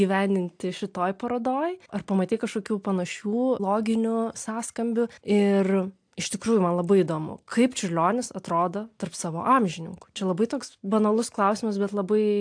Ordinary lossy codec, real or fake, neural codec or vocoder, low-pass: AAC, 64 kbps; real; none; 9.9 kHz